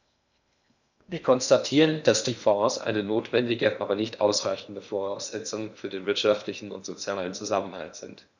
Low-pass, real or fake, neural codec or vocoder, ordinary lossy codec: 7.2 kHz; fake; codec, 16 kHz in and 24 kHz out, 0.8 kbps, FocalCodec, streaming, 65536 codes; none